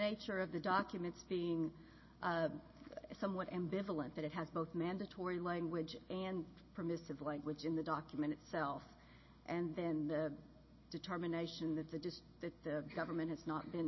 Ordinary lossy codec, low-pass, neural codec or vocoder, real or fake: MP3, 24 kbps; 7.2 kHz; none; real